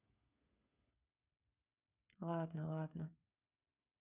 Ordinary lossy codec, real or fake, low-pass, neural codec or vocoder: none; fake; 3.6 kHz; codec, 16 kHz, 8 kbps, FreqCodec, smaller model